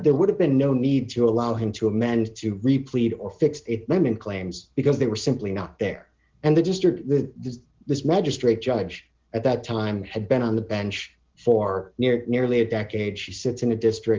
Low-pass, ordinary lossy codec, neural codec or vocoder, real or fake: 7.2 kHz; Opus, 16 kbps; none; real